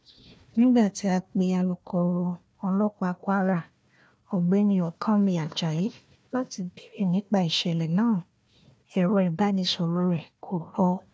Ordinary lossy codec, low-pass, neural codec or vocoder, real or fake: none; none; codec, 16 kHz, 1 kbps, FunCodec, trained on Chinese and English, 50 frames a second; fake